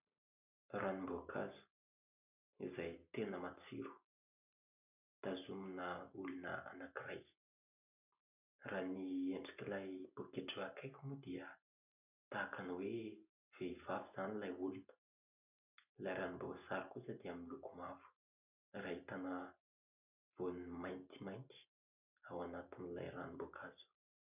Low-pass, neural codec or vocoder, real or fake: 3.6 kHz; none; real